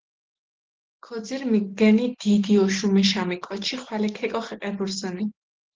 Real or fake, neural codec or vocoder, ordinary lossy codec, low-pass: real; none; Opus, 16 kbps; 7.2 kHz